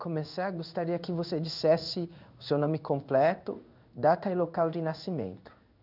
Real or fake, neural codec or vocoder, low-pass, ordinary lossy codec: fake; codec, 16 kHz in and 24 kHz out, 1 kbps, XY-Tokenizer; 5.4 kHz; none